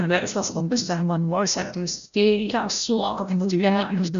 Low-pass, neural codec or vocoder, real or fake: 7.2 kHz; codec, 16 kHz, 0.5 kbps, FreqCodec, larger model; fake